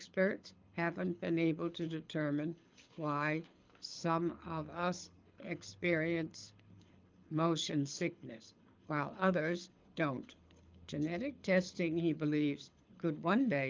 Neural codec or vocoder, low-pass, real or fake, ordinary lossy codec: codec, 24 kHz, 6 kbps, HILCodec; 7.2 kHz; fake; Opus, 24 kbps